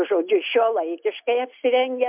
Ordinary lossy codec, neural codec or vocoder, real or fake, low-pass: MP3, 32 kbps; none; real; 3.6 kHz